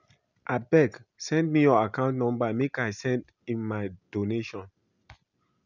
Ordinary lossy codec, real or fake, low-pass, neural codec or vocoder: none; real; 7.2 kHz; none